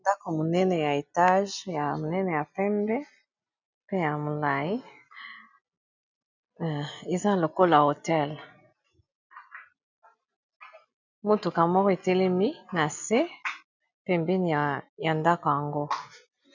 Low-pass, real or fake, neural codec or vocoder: 7.2 kHz; real; none